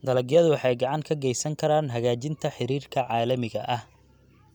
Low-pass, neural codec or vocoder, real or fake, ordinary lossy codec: 19.8 kHz; none; real; none